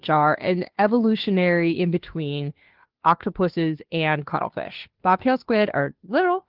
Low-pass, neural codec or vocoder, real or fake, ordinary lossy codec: 5.4 kHz; codec, 16 kHz, 2 kbps, FunCodec, trained on Chinese and English, 25 frames a second; fake; Opus, 16 kbps